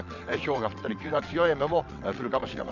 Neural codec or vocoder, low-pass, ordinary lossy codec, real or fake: vocoder, 22.05 kHz, 80 mel bands, WaveNeXt; 7.2 kHz; none; fake